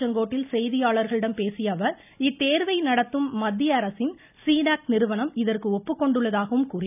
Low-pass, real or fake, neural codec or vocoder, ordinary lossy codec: 3.6 kHz; real; none; none